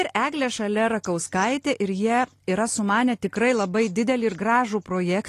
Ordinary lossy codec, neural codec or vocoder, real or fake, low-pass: AAC, 48 kbps; none; real; 14.4 kHz